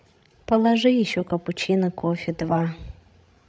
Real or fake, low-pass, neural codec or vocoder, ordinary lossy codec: fake; none; codec, 16 kHz, 16 kbps, FreqCodec, larger model; none